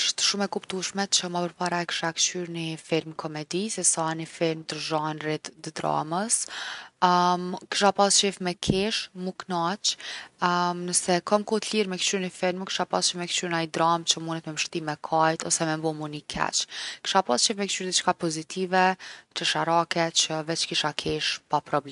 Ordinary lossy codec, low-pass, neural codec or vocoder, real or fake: none; 10.8 kHz; none; real